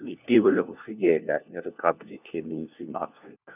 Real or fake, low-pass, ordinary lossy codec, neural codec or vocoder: fake; 3.6 kHz; none; codec, 16 kHz, 1 kbps, FunCodec, trained on LibriTTS, 50 frames a second